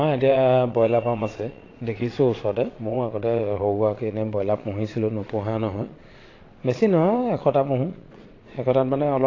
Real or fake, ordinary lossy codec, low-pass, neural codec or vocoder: fake; AAC, 32 kbps; 7.2 kHz; vocoder, 22.05 kHz, 80 mel bands, Vocos